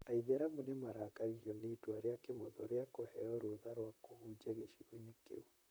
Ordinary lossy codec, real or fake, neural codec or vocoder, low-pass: none; fake; vocoder, 44.1 kHz, 128 mel bands, Pupu-Vocoder; none